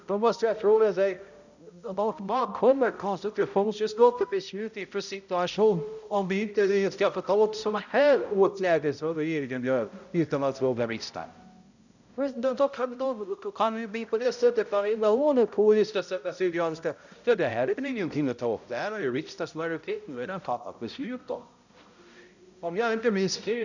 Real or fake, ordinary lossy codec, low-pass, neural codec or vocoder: fake; none; 7.2 kHz; codec, 16 kHz, 0.5 kbps, X-Codec, HuBERT features, trained on balanced general audio